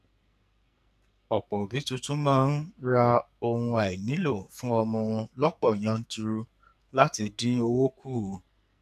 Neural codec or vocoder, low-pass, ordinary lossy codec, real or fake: codec, 44.1 kHz, 2.6 kbps, SNAC; 14.4 kHz; none; fake